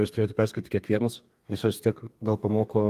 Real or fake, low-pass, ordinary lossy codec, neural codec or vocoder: fake; 14.4 kHz; Opus, 32 kbps; codec, 32 kHz, 1.9 kbps, SNAC